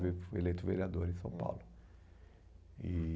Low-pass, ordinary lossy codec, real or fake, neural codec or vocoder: none; none; real; none